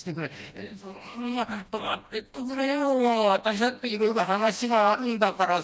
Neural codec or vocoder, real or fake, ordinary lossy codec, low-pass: codec, 16 kHz, 1 kbps, FreqCodec, smaller model; fake; none; none